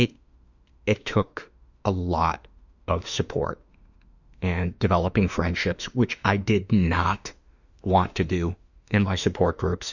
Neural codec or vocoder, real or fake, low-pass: autoencoder, 48 kHz, 32 numbers a frame, DAC-VAE, trained on Japanese speech; fake; 7.2 kHz